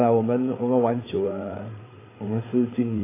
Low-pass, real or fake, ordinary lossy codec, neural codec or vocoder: 3.6 kHz; fake; AAC, 16 kbps; codec, 16 kHz, 8 kbps, FreqCodec, larger model